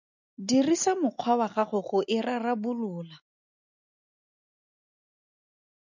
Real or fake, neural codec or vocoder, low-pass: real; none; 7.2 kHz